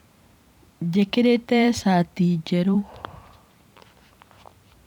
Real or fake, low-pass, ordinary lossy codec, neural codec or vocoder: fake; 19.8 kHz; none; vocoder, 44.1 kHz, 128 mel bands every 512 samples, BigVGAN v2